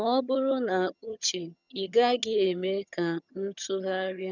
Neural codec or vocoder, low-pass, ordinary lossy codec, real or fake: vocoder, 22.05 kHz, 80 mel bands, HiFi-GAN; 7.2 kHz; none; fake